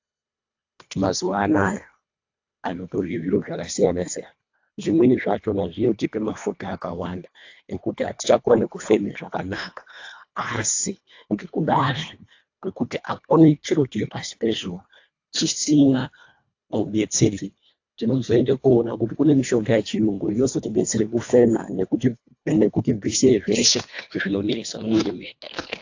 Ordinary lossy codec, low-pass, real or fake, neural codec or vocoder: AAC, 48 kbps; 7.2 kHz; fake; codec, 24 kHz, 1.5 kbps, HILCodec